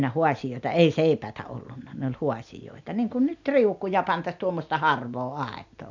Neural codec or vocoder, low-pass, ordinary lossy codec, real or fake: none; 7.2 kHz; MP3, 64 kbps; real